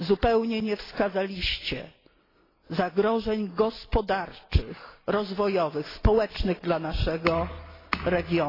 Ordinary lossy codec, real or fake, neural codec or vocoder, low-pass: AAC, 24 kbps; real; none; 5.4 kHz